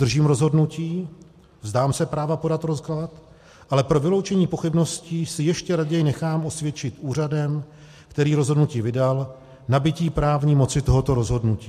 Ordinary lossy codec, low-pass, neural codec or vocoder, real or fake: MP3, 64 kbps; 14.4 kHz; none; real